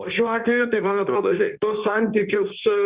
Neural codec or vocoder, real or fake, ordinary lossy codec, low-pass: codec, 16 kHz in and 24 kHz out, 1.1 kbps, FireRedTTS-2 codec; fake; Opus, 64 kbps; 3.6 kHz